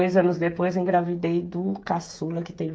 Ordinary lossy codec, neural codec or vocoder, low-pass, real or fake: none; codec, 16 kHz, 8 kbps, FreqCodec, smaller model; none; fake